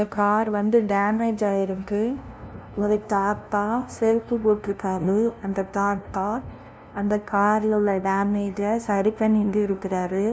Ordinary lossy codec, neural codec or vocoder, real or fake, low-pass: none; codec, 16 kHz, 0.5 kbps, FunCodec, trained on LibriTTS, 25 frames a second; fake; none